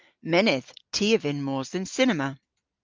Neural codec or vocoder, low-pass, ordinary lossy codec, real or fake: none; 7.2 kHz; Opus, 24 kbps; real